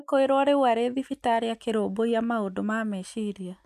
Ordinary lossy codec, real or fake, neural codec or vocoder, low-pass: MP3, 96 kbps; real; none; 14.4 kHz